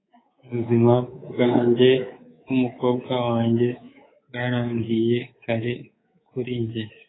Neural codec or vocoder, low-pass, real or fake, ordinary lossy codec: codec, 24 kHz, 3.1 kbps, DualCodec; 7.2 kHz; fake; AAC, 16 kbps